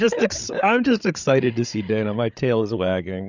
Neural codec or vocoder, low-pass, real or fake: codec, 16 kHz, 8 kbps, FreqCodec, larger model; 7.2 kHz; fake